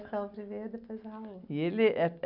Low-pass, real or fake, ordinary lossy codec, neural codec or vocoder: 5.4 kHz; real; none; none